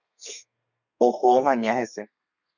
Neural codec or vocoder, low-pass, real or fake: codec, 32 kHz, 1.9 kbps, SNAC; 7.2 kHz; fake